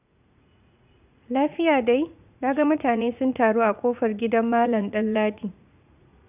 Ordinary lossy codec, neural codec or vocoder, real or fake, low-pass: none; vocoder, 44.1 kHz, 80 mel bands, Vocos; fake; 3.6 kHz